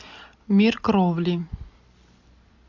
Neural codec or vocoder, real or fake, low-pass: none; real; 7.2 kHz